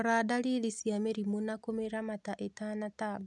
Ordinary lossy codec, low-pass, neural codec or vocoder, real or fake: none; 10.8 kHz; none; real